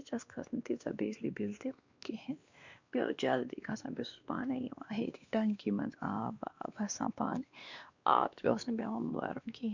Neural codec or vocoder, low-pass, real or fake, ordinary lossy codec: codec, 16 kHz, 2 kbps, X-Codec, WavLM features, trained on Multilingual LibriSpeech; 7.2 kHz; fake; Opus, 64 kbps